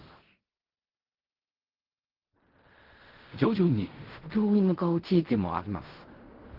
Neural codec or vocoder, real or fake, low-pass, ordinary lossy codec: codec, 16 kHz in and 24 kHz out, 0.4 kbps, LongCat-Audio-Codec, fine tuned four codebook decoder; fake; 5.4 kHz; Opus, 16 kbps